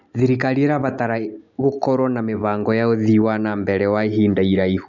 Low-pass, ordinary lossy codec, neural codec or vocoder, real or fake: 7.2 kHz; none; none; real